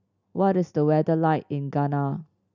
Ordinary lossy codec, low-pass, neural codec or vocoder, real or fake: none; 7.2 kHz; none; real